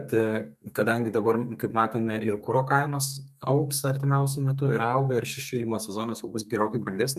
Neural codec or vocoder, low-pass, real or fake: codec, 32 kHz, 1.9 kbps, SNAC; 14.4 kHz; fake